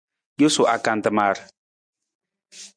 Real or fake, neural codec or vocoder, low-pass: real; none; 9.9 kHz